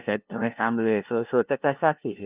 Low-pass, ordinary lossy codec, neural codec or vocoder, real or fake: 3.6 kHz; Opus, 32 kbps; codec, 16 kHz, 0.5 kbps, FunCodec, trained on LibriTTS, 25 frames a second; fake